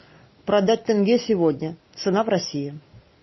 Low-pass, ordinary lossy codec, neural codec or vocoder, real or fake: 7.2 kHz; MP3, 24 kbps; none; real